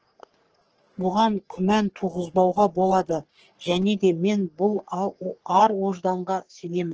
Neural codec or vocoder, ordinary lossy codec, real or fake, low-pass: codec, 44.1 kHz, 3.4 kbps, Pupu-Codec; Opus, 16 kbps; fake; 7.2 kHz